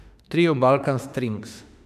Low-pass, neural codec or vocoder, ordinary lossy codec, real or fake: 14.4 kHz; autoencoder, 48 kHz, 32 numbers a frame, DAC-VAE, trained on Japanese speech; none; fake